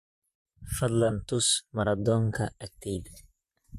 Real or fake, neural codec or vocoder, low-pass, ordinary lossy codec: fake; vocoder, 44.1 kHz, 128 mel bands, Pupu-Vocoder; 14.4 kHz; MP3, 64 kbps